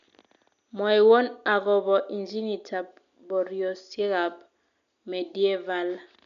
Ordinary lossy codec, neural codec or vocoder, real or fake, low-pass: none; none; real; 7.2 kHz